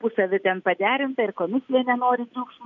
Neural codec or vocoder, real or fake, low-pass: none; real; 7.2 kHz